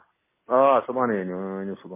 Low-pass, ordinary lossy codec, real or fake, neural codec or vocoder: 3.6 kHz; MP3, 16 kbps; real; none